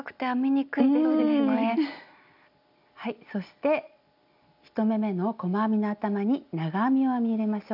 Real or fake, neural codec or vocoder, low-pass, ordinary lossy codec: real; none; 5.4 kHz; none